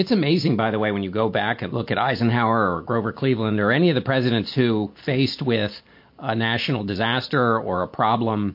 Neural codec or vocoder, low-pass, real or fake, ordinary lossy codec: none; 5.4 kHz; real; MP3, 32 kbps